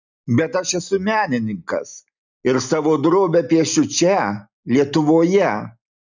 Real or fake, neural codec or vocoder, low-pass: real; none; 7.2 kHz